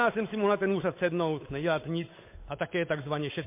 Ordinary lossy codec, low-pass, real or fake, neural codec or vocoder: MP3, 24 kbps; 3.6 kHz; fake; codec, 16 kHz, 8 kbps, FunCodec, trained on Chinese and English, 25 frames a second